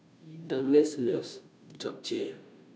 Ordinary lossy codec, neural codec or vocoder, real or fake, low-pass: none; codec, 16 kHz, 0.5 kbps, FunCodec, trained on Chinese and English, 25 frames a second; fake; none